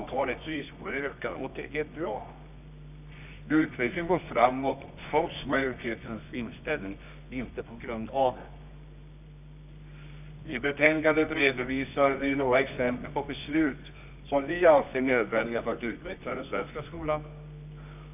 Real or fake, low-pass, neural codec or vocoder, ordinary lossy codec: fake; 3.6 kHz; codec, 24 kHz, 0.9 kbps, WavTokenizer, medium music audio release; none